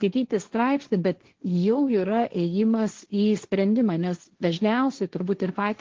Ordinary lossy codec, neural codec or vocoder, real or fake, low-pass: Opus, 16 kbps; codec, 16 kHz, 1.1 kbps, Voila-Tokenizer; fake; 7.2 kHz